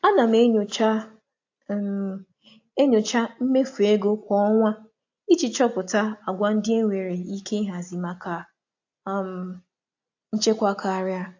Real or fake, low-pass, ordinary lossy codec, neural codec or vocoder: real; 7.2 kHz; AAC, 48 kbps; none